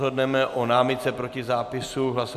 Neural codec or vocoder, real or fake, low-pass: none; real; 14.4 kHz